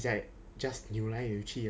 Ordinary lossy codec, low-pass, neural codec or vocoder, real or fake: none; none; none; real